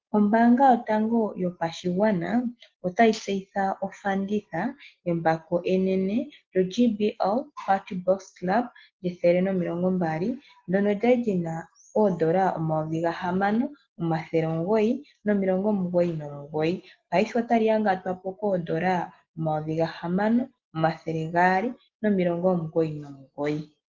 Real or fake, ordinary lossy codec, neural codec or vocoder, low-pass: real; Opus, 16 kbps; none; 7.2 kHz